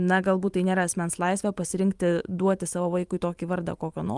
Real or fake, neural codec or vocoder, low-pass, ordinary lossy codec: fake; vocoder, 44.1 kHz, 128 mel bands every 512 samples, BigVGAN v2; 10.8 kHz; Opus, 32 kbps